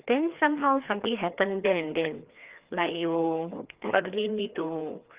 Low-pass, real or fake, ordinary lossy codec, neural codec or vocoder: 3.6 kHz; fake; Opus, 32 kbps; codec, 16 kHz, 2 kbps, FreqCodec, larger model